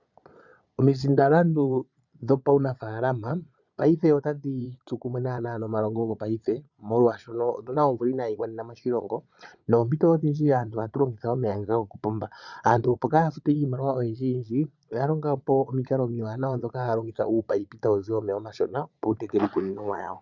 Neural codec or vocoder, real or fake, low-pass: vocoder, 22.05 kHz, 80 mel bands, Vocos; fake; 7.2 kHz